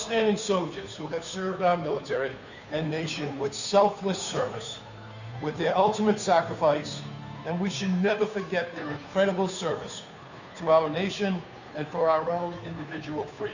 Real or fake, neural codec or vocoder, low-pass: fake; codec, 16 kHz, 2 kbps, FunCodec, trained on Chinese and English, 25 frames a second; 7.2 kHz